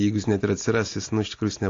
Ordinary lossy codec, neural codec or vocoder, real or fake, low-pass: AAC, 32 kbps; none; real; 7.2 kHz